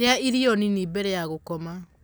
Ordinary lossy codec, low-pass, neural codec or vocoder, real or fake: none; none; none; real